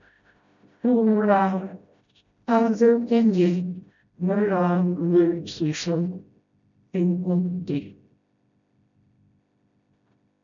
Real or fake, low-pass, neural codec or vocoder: fake; 7.2 kHz; codec, 16 kHz, 0.5 kbps, FreqCodec, smaller model